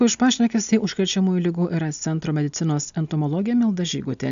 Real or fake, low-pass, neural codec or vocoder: real; 7.2 kHz; none